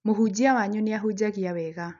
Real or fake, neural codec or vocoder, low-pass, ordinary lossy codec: real; none; 7.2 kHz; none